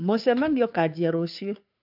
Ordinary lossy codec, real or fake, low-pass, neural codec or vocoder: AAC, 48 kbps; fake; 5.4 kHz; codec, 16 kHz, 2 kbps, FunCodec, trained on Chinese and English, 25 frames a second